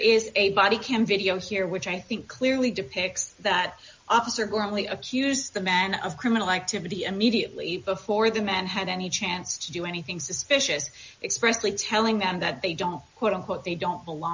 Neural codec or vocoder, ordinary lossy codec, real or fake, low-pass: none; MP3, 48 kbps; real; 7.2 kHz